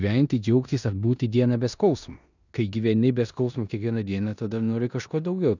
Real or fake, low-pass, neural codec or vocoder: fake; 7.2 kHz; codec, 16 kHz in and 24 kHz out, 0.9 kbps, LongCat-Audio-Codec, four codebook decoder